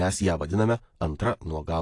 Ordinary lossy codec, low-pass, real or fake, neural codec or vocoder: AAC, 48 kbps; 10.8 kHz; real; none